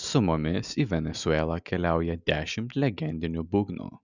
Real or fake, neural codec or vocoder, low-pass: real; none; 7.2 kHz